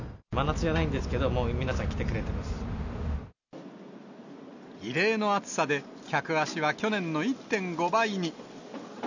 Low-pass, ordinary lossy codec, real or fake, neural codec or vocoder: 7.2 kHz; none; real; none